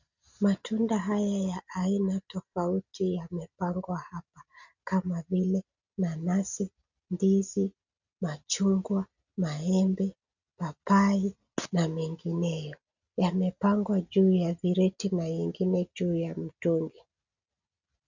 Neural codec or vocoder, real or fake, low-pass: none; real; 7.2 kHz